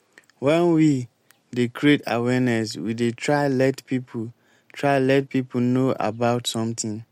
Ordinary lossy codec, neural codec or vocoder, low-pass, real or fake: MP3, 64 kbps; none; 19.8 kHz; real